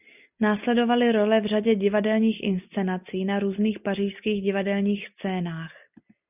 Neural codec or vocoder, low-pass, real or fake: none; 3.6 kHz; real